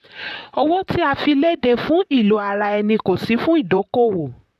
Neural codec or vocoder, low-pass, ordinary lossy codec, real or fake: vocoder, 44.1 kHz, 128 mel bands, Pupu-Vocoder; 14.4 kHz; none; fake